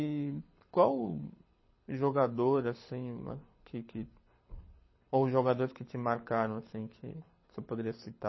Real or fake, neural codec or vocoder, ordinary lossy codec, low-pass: fake; codec, 24 kHz, 6 kbps, HILCodec; MP3, 24 kbps; 7.2 kHz